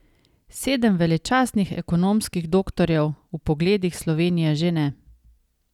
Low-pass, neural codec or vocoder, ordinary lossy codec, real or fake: 19.8 kHz; none; none; real